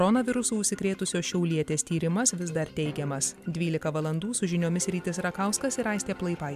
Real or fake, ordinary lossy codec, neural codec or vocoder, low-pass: real; MP3, 96 kbps; none; 14.4 kHz